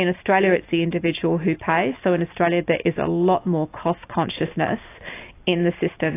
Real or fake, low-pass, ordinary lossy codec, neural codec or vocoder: real; 3.6 kHz; AAC, 24 kbps; none